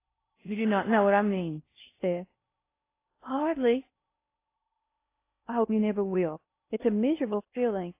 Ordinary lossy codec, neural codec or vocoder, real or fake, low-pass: AAC, 24 kbps; codec, 16 kHz in and 24 kHz out, 0.6 kbps, FocalCodec, streaming, 4096 codes; fake; 3.6 kHz